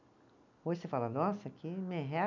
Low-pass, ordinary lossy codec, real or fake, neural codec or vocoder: 7.2 kHz; none; real; none